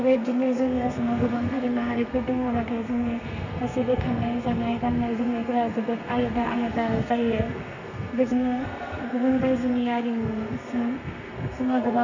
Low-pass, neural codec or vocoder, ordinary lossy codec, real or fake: 7.2 kHz; codec, 32 kHz, 1.9 kbps, SNAC; none; fake